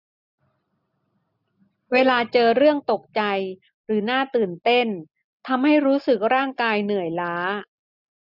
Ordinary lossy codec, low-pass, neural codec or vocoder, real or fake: none; 5.4 kHz; none; real